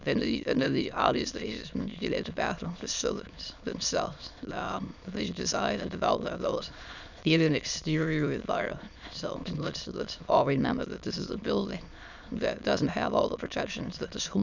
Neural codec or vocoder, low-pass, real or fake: autoencoder, 22.05 kHz, a latent of 192 numbers a frame, VITS, trained on many speakers; 7.2 kHz; fake